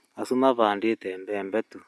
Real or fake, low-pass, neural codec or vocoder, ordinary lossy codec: real; none; none; none